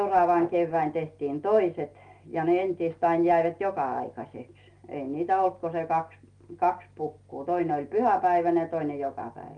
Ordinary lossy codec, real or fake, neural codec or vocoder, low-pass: Opus, 32 kbps; real; none; 9.9 kHz